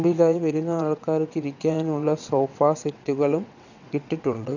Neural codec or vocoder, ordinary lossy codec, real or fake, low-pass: vocoder, 22.05 kHz, 80 mel bands, WaveNeXt; none; fake; 7.2 kHz